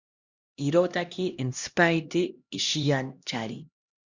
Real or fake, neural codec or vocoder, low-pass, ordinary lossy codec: fake; codec, 16 kHz, 1 kbps, X-Codec, HuBERT features, trained on LibriSpeech; 7.2 kHz; Opus, 64 kbps